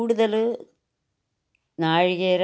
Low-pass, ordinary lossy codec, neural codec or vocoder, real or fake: none; none; none; real